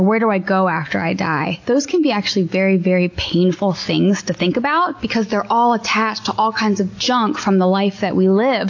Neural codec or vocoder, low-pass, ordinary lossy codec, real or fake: none; 7.2 kHz; AAC, 48 kbps; real